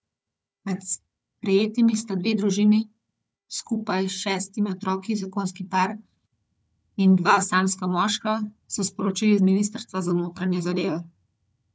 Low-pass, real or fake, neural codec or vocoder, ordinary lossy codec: none; fake; codec, 16 kHz, 4 kbps, FunCodec, trained on Chinese and English, 50 frames a second; none